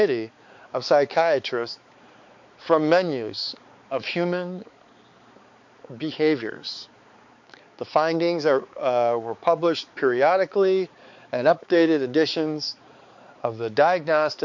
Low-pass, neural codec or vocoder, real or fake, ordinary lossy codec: 7.2 kHz; codec, 16 kHz, 4 kbps, X-Codec, HuBERT features, trained on balanced general audio; fake; MP3, 48 kbps